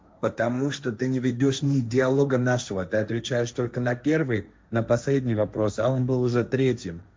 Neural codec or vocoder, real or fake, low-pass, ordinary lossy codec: codec, 16 kHz, 1.1 kbps, Voila-Tokenizer; fake; none; none